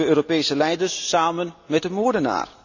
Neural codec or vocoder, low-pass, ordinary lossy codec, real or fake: none; 7.2 kHz; none; real